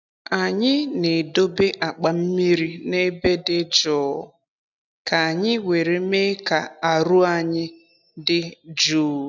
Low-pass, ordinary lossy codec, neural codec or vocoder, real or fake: 7.2 kHz; none; none; real